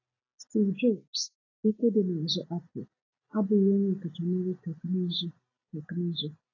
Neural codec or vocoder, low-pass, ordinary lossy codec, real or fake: none; 7.2 kHz; AAC, 32 kbps; real